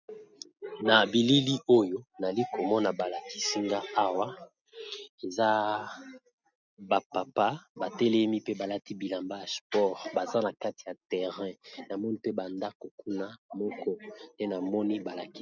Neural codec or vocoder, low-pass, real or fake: none; 7.2 kHz; real